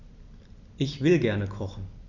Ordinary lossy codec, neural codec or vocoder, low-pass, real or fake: none; none; 7.2 kHz; real